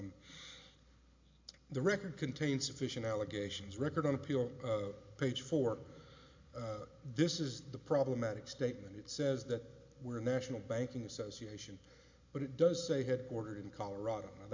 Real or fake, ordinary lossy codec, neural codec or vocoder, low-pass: real; MP3, 48 kbps; none; 7.2 kHz